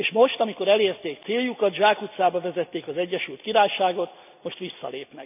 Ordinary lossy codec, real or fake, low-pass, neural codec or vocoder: none; real; 3.6 kHz; none